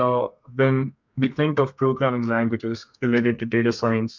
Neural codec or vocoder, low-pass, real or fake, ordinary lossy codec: codec, 32 kHz, 1.9 kbps, SNAC; 7.2 kHz; fake; MP3, 64 kbps